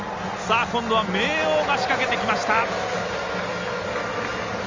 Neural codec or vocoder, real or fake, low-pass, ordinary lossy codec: none; real; 7.2 kHz; Opus, 32 kbps